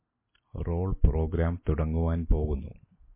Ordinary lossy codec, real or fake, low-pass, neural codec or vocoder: MP3, 24 kbps; real; 3.6 kHz; none